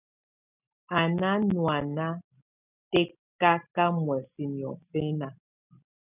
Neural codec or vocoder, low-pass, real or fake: none; 3.6 kHz; real